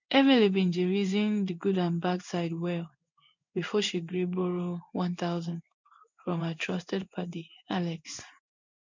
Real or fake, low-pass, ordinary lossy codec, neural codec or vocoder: fake; 7.2 kHz; MP3, 64 kbps; codec, 16 kHz in and 24 kHz out, 1 kbps, XY-Tokenizer